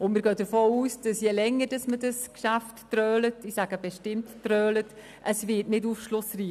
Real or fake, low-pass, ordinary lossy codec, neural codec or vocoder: real; 14.4 kHz; none; none